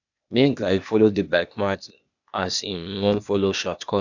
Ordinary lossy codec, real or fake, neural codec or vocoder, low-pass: none; fake; codec, 16 kHz, 0.8 kbps, ZipCodec; 7.2 kHz